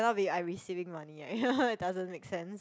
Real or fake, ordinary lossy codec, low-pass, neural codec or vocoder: real; none; none; none